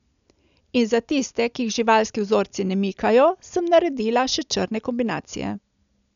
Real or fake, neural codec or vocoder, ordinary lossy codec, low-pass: real; none; none; 7.2 kHz